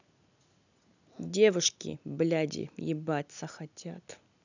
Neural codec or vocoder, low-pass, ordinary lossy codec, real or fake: none; 7.2 kHz; none; real